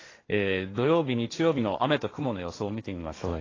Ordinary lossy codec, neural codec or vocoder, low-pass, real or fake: AAC, 32 kbps; codec, 16 kHz, 1.1 kbps, Voila-Tokenizer; 7.2 kHz; fake